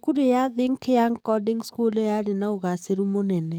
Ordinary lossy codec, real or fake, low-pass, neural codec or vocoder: none; fake; 19.8 kHz; codec, 44.1 kHz, 7.8 kbps, DAC